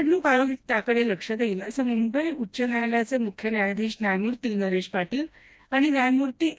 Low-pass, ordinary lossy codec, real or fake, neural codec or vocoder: none; none; fake; codec, 16 kHz, 1 kbps, FreqCodec, smaller model